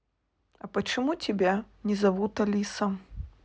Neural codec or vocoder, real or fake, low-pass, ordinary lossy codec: none; real; none; none